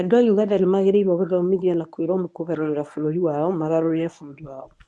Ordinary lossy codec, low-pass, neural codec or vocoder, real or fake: none; none; codec, 24 kHz, 0.9 kbps, WavTokenizer, medium speech release version 1; fake